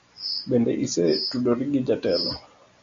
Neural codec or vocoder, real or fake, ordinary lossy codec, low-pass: none; real; MP3, 48 kbps; 7.2 kHz